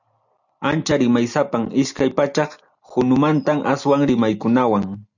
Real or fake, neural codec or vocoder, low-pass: real; none; 7.2 kHz